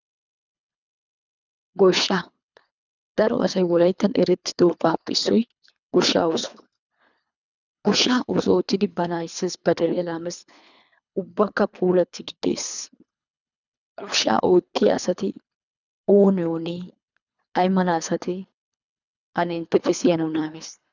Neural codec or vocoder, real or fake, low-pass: codec, 24 kHz, 3 kbps, HILCodec; fake; 7.2 kHz